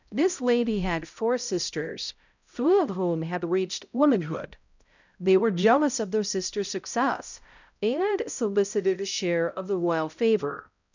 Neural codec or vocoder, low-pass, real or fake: codec, 16 kHz, 0.5 kbps, X-Codec, HuBERT features, trained on balanced general audio; 7.2 kHz; fake